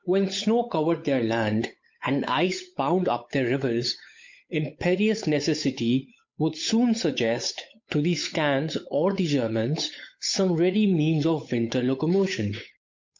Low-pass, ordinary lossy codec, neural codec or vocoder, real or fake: 7.2 kHz; MP3, 48 kbps; codec, 16 kHz, 8 kbps, FunCodec, trained on Chinese and English, 25 frames a second; fake